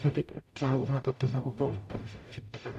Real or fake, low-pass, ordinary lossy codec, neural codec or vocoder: fake; 14.4 kHz; none; codec, 44.1 kHz, 0.9 kbps, DAC